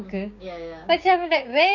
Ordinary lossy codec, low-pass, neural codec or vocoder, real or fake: none; 7.2 kHz; codec, 16 kHz, 6 kbps, DAC; fake